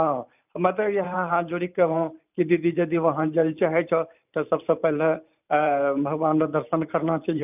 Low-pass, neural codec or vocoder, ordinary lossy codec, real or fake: 3.6 kHz; vocoder, 44.1 kHz, 128 mel bands every 512 samples, BigVGAN v2; none; fake